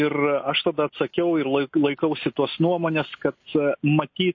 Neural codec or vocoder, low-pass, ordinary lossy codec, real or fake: none; 7.2 kHz; MP3, 32 kbps; real